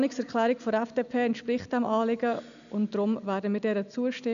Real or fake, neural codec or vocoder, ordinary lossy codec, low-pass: real; none; none; 7.2 kHz